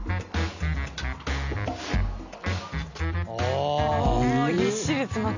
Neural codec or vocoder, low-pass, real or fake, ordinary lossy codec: none; 7.2 kHz; real; none